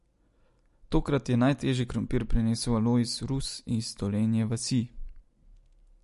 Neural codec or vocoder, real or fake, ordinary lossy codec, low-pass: none; real; MP3, 48 kbps; 14.4 kHz